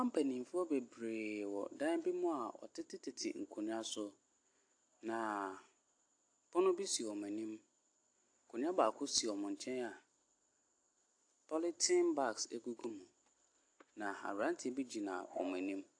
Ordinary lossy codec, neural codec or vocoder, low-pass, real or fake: AAC, 48 kbps; none; 9.9 kHz; real